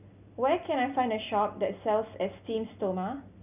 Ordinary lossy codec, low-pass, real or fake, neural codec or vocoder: none; 3.6 kHz; real; none